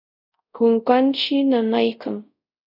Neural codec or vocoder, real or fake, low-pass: codec, 24 kHz, 0.5 kbps, DualCodec; fake; 5.4 kHz